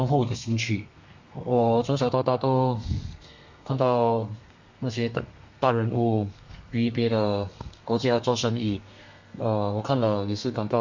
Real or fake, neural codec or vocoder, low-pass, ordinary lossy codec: fake; codec, 32 kHz, 1.9 kbps, SNAC; 7.2 kHz; MP3, 48 kbps